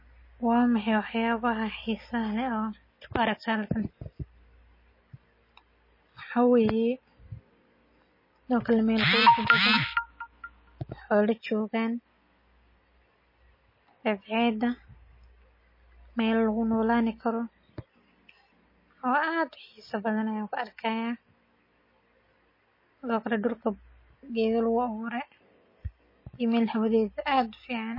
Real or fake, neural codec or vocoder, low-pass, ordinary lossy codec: real; none; 5.4 kHz; MP3, 24 kbps